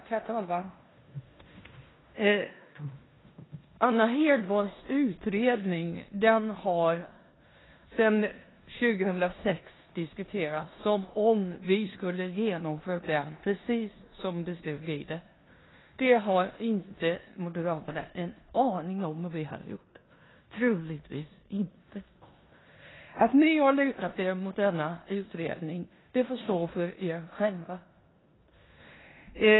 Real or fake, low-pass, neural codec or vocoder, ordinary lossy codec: fake; 7.2 kHz; codec, 16 kHz in and 24 kHz out, 0.9 kbps, LongCat-Audio-Codec, four codebook decoder; AAC, 16 kbps